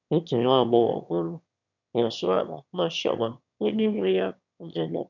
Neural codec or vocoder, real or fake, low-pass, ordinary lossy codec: autoencoder, 22.05 kHz, a latent of 192 numbers a frame, VITS, trained on one speaker; fake; 7.2 kHz; none